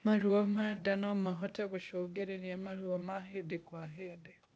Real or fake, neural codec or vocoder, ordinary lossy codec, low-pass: fake; codec, 16 kHz, 0.8 kbps, ZipCodec; none; none